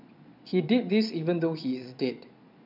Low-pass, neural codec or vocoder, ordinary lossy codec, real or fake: 5.4 kHz; vocoder, 22.05 kHz, 80 mel bands, Vocos; none; fake